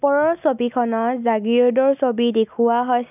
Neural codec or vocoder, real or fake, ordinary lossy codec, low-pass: none; real; none; 3.6 kHz